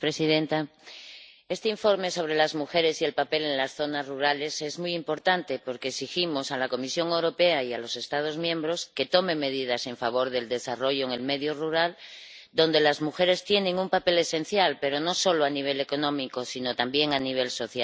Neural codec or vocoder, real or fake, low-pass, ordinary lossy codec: none; real; none; none